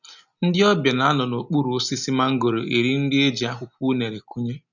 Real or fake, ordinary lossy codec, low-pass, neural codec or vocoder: real; none; 7.2 kHz; none